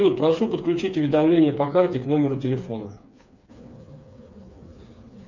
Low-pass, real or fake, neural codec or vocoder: 7.2 kHz; fake; codec, 16 kHz, 4 kbps, FreqCodec, smaller model